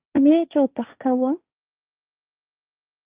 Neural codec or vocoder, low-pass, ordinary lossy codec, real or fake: codec, 16 kHz, 1.1 kbps, Voila-Tokenizer; 3.6 kHz; Opus, 32 kbps; fake